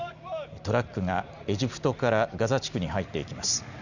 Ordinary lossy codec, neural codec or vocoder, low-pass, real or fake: none; none; 7.2 kHz; real